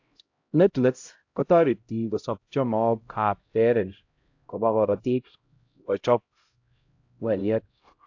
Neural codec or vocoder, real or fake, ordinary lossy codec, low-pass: codec, 16 kHz, 0.5 kbps, X-Codec, HuBERT features, trained on LibriSpeech; fake; AAC, 48 kbps; 7.2 kHz